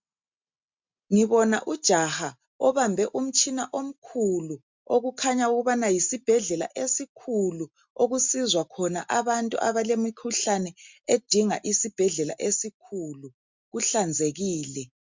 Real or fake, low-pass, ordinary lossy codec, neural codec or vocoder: real; 7.2 kHz; MP3, 64 kbps; none